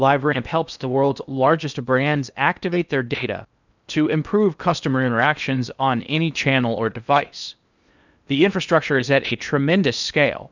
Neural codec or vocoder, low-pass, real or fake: codec, 16 kHz in and 24 kHz out, 0.8 kbps, FocalCodec, streaming, 65536 codes; 7.2 kHz; fake